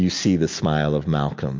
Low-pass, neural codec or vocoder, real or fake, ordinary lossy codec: 7.2 kHz; none; real; MP3, 48 kbps